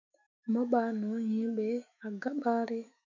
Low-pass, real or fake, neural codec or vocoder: 7.2 kHz; fake; autoencoder, 48 kHz, 128 numbers a frame, DAC-VAE, trained on Japanese speech